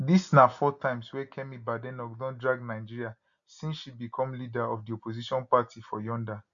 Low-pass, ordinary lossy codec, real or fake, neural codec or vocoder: 7.2 kHz; none; real; none